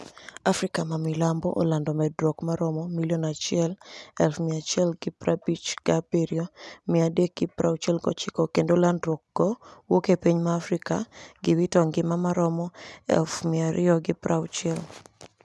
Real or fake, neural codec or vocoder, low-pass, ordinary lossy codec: real; none; none; none